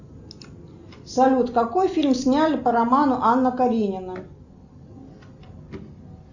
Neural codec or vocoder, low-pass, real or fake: none; 7.2 kHz; real